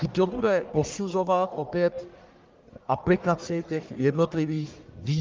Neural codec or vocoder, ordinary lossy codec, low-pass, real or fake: codec, 44.1 kHz, 1.7 kbps, Pupu-Codec; Opus, 24 kbps; 7.2 kHz; fake